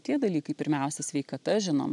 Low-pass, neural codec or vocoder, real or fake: 10.8 kHz; none; real